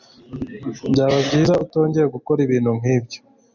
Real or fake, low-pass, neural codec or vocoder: real; 7.2 kHz; none